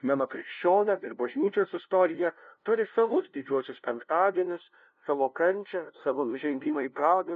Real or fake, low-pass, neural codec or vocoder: fake; 7.2 kHz; codec, 16 kHz, 0.5 kbps, FunCodec, trained on LibriTTS, 25 frames a second